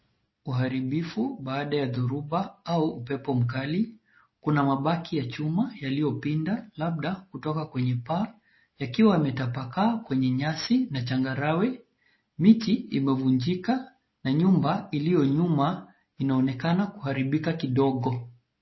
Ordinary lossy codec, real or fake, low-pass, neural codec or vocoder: MP3, 24 kbps; real; 7.2 kHz; none